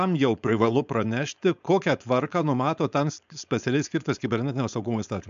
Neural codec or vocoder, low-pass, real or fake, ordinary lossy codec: codec, 16 kHz, 4.8 kbps, FACodec; 7.2 kHz; fake; AAC, 96 kbps